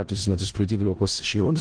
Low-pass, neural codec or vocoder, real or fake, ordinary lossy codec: 9.9 kHz; codec, 16 kHz in and 24 kHz out, 0.4 kbps, LongCat-Audio-Codec, four codebook decoder; fake; Opus, 16 kbps